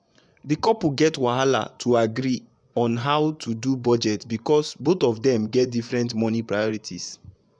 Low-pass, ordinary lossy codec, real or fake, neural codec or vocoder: 9.9 kHz; none; fake; vocoder, 24 kHz, 100 mel bands, Vocos